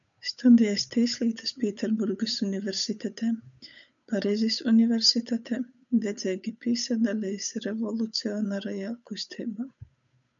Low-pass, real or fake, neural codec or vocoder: 7.2 kHz; fake; codec, 16 kHz, 8 kbps, FunCodec, trained on Chinese and English, 25 frames a second